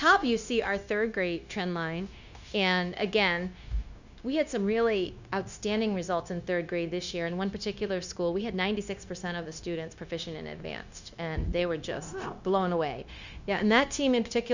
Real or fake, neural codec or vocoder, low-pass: fake; codec, 16 kHz, 0.9 kbps, LongCat-Audio-Codec; 7.2 kHz